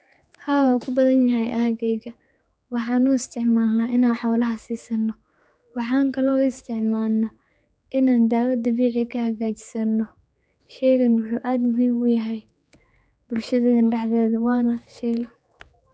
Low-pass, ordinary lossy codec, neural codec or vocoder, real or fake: none; none; codec, 16 kHz, 4 kbps, X-Codec, HuBERT features, trained on general audio; fake